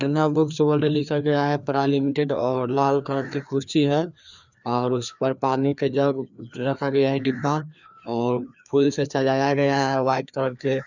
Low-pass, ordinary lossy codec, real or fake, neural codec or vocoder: 7.2 kHz; none; fake; codec, 16 kHz, 2 kbps, FreqCodec, larger model